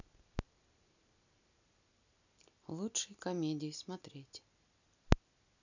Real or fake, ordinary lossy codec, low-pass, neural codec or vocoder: real; none; 7.2 kHz; none